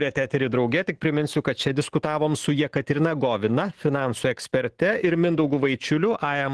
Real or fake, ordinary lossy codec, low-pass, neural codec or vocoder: fake; Opus, 16 kbps; 10.8 kHz; autoencoder, 48 kHz, 128 numbers a frame, DAC-VAE, trained on Japanese speech